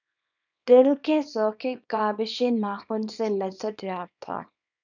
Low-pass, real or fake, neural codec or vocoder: 7.2 kHz; fake; codec, 24 kHz, 0.9 kbps, WavTokenizer, small release